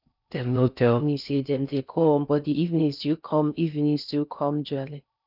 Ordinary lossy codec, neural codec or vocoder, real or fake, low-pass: none; codec, 16 kHz in and 24 kHz out, 0.6 kbps, FocalCodec, streaming, 4096 codes; fake; 5.4 kHz